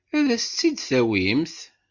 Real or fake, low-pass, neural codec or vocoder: real; 7.2 kHz; none